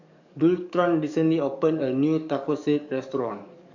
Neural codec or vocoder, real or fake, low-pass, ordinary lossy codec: codec, 44.1 kHz, 7.8 kbps, DAC; fake; 7.2 kHz; none